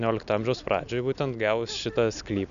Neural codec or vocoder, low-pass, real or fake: none; 7.2 kHz; real